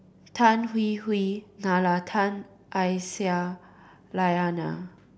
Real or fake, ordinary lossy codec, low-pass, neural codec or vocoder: real; none; none; none